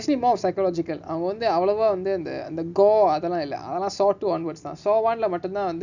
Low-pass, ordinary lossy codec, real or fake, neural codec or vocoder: 7.2 kHz; none; real; none